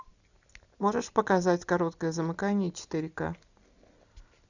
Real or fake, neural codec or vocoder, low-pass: real; none; 7.2 kHz